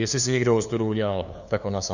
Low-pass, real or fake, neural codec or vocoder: 7.2 kHz; fake; codec, 16 kHz, 2 kbps, FunCodec, trained on LibriTTS, 25 frames a second